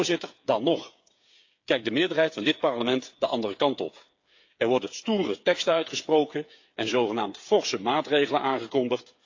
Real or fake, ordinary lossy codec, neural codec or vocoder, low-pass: fake; AAC, 48 kbps; codec, 16 kHz, 8 kbps, FreqCodec, smaller model; 7.2 kHz